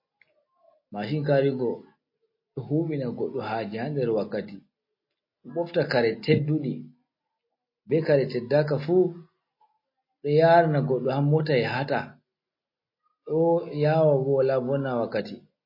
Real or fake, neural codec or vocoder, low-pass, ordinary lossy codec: real; none; 5.4 kHz; MP3, 24 kbps